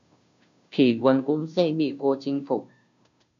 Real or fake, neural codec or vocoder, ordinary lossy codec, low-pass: fake; codec, 16 kHz, 0.5 kbps, FunCodec, trained on Chinese and English, 25 frames a second; MP3, 96 kbps; 7.2 kHz